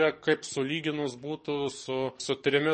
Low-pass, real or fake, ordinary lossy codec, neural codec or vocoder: 10.8 kHz; fake; MP3, 32 kbps; codec, 44.1 kHz, 7.8 kbps, DAC